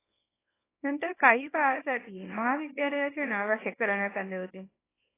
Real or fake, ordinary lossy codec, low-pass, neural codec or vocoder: fake; AAC, 16 kbps; 3.6 kHz; codec, 24 kHz, 0.9 kbps, WavTokenizer, small release